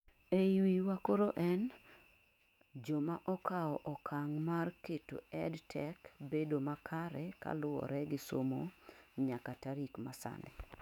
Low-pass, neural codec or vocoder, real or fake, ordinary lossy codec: 19.8 kHz; autoencoder, 48 kHz, 128 numbers a frame, DAC-VAE, trained on Japanese speech; fake; none